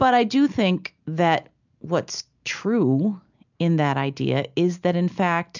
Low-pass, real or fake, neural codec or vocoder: 7.2 kHz; real; none